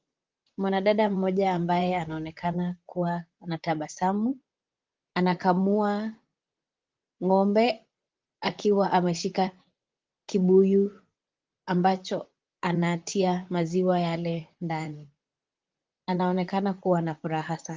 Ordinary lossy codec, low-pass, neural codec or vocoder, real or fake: Opus, 24 kbps; 7.2 kHz; vocoder, 44.1 kHz, 128 mel bands, Pupu-Vocoder; fake